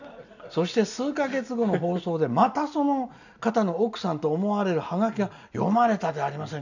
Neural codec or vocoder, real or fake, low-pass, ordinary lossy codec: none; real; 7.2 kHz; none